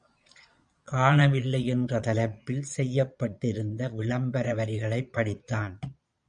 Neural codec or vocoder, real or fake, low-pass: vocoder, 22.05 kHz, 80 mel bands, Vocos; fake; 9.9 kHz